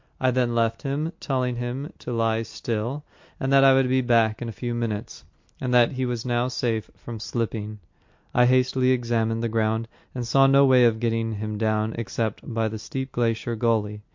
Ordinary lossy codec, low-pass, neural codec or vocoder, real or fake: MP3, 48 kbps; 7.2 kHz; none; real